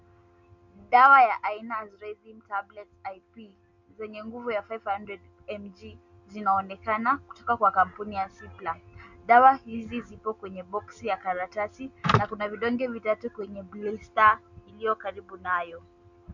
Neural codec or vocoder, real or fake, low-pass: none; real; 7.2 kHz